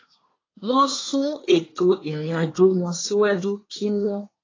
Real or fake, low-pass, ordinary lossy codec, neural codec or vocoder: fake; 7.2 kHz; AAC, 32 kbps; codec, 24 kHz, 1 kbps, SNAC